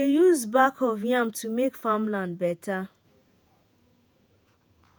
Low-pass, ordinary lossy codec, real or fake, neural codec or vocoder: none; none; fake; vocoder, 48 kHz, 128 mel bands, Vocos